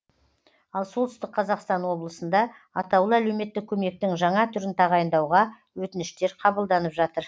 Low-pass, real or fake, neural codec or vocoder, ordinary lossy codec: none; real; none; none